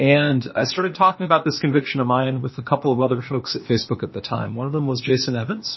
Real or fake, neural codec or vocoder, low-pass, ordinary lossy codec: fake; codec, 16 kHz, 0.8 kbps, ZipCodec; 7.2 kHz; MP3, 24 kbps